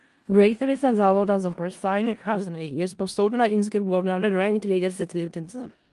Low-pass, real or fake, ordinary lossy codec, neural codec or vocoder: 10.8 kHz; fake; Opus, 32 kbps; codec, 16 kHz in and 24 kHz out, 0.4 kbps, LongCat-Audio-Codec, four codebook decoder